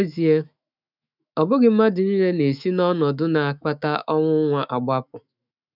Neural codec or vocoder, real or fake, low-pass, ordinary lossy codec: autoencoder, 48 kHz, 128 numbers a frame, DAC-VAE, trained on Japanese speech; fake; 5.4 kHz; none